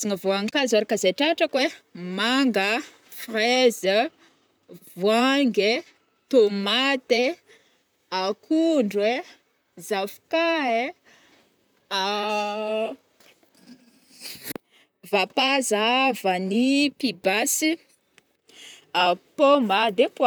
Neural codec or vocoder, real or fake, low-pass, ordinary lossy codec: vocoder, 44.1 kHz, 128 mel bands, Pupu-Vocoder; fake; none; none